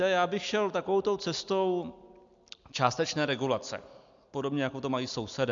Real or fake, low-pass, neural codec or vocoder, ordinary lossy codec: real; 7.2 kHz; none; MP3, 64 kbps